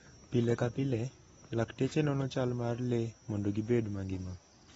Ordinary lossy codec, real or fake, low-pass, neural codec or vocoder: AAC, 24 kbps; real; 19.8 kHz; none